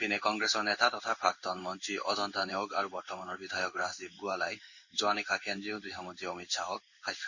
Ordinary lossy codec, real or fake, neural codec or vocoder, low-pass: Opus, 64 kbps; fake; codec, 16 kHz in and 24 kHz out, 1 kbps, XY-Tokenizer; 7.2 kHz